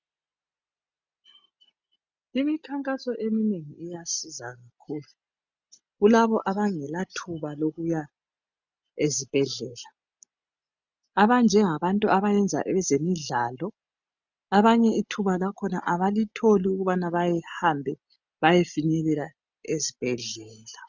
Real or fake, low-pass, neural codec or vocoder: real; 7.2 kHz; none